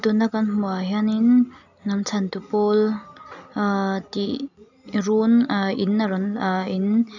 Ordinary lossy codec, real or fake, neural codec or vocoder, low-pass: none; real; none; 7.2 kHz